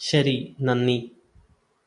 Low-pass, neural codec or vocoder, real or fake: 10.8 kHz; none; real